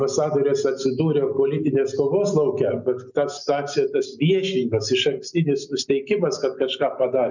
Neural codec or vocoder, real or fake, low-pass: none; real; 7.2 kHz